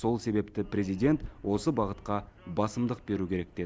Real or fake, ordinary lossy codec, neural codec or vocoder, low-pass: real; none; none; none